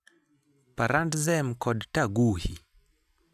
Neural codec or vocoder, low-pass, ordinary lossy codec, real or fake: none; 14.4 kHz; none; real